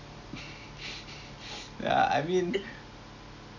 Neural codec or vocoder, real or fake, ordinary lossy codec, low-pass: none; real; none; 7.2 kHz